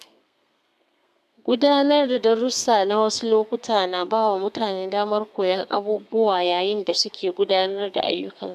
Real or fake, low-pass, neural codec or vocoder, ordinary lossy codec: fake; 14.4 kHz; codec, 32 kHz, 1.9 kbps, SNAC; none